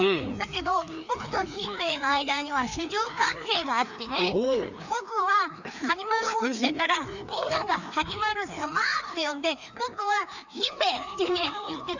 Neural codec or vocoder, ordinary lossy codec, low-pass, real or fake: codec, 16 kHz, 2 kbps, FreqCodec, larger model; none; 7.2 kHz; fake